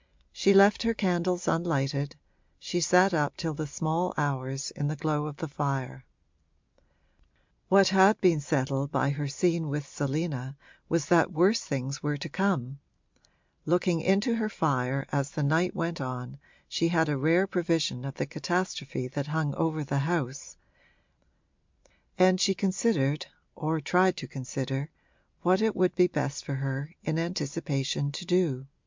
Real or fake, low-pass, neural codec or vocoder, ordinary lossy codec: real; 7.2 kHz; none; MP3, 64 kbps